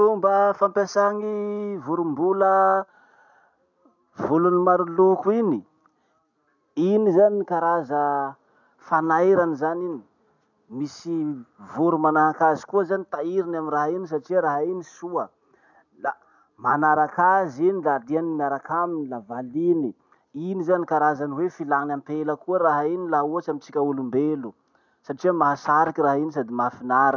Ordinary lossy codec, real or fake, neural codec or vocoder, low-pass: none; real; none; 7.2 kHz